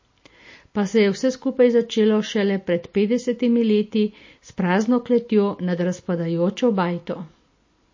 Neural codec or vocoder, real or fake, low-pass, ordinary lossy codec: none; real; 7.2 kHz; MP3, 32 kbps